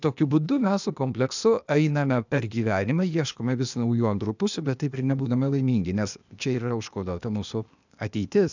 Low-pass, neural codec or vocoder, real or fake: 7.2 kHz; codec, 16 kHz, 0.8 kbps, ZipCodec; fake